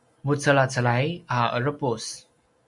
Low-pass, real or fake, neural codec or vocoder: 10.8 kHz; real; none